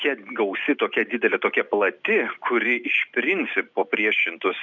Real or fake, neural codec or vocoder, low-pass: real; none; 7.2 kHz